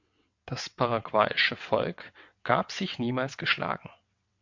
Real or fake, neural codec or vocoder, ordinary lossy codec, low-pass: fake; vocoder, 22.05 kHz, 80 mel bands, WaveNeXt; MP3, 48 kbps; 7.2 kHz